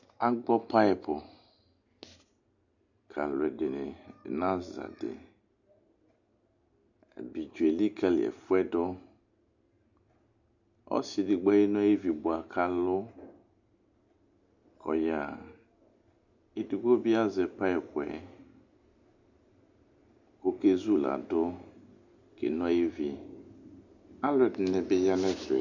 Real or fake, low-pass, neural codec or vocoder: real; 7.2 kHz; none